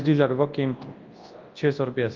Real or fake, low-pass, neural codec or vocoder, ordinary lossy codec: fake; 7.2 kHz; codec, 24 kHz, 0.9 kbps, WavTokenizer, large speech release; Opus, 32 kbps